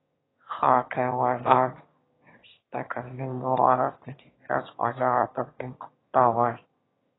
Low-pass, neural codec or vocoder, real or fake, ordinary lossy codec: 7.2 kHz; autoencoder, 22.05 kHz, a latent of 192 numbers a frame, VITS, trained on one speaker; fake; AAC, 16 kbps